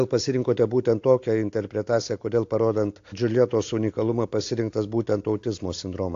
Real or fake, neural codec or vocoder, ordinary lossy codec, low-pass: real; none; AAC, 48 kbps; 7.2 kHz